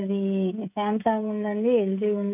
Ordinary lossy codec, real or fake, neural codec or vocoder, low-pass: none; fake; codec, 16 kHz, 16 kbps, FreqCodec, smaller model; 3.6 kHz